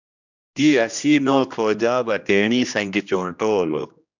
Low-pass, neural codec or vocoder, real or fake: 7.2 kHz; codec, 16 kHz, 1 kbps, X-Codec, HuBERT features, trained on general audio; fake